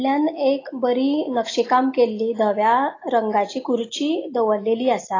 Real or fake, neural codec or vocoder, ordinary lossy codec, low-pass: real; none; AAC, 32 kbps; 7.2 kHz